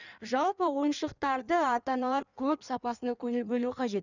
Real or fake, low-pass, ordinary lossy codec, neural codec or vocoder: fake; 7.2 kHz; none; codec, 16 kHz in and 24 kHz out, 1.1 kbps, FireRedTTS-2 codec